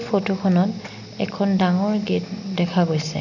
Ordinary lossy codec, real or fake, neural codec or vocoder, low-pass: none; real; none; 7.2 kHz